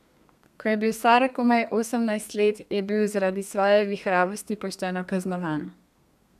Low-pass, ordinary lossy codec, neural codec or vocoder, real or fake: 14.4 kHz; none; codec, 32 kHz, 1.9 kbps, SNAC; fake